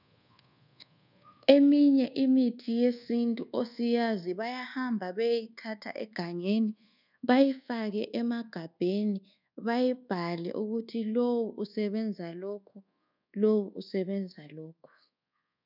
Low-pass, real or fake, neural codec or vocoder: 5.4 kHz; fake; codec, 24 kHz, 1.2 kbps, DualCodec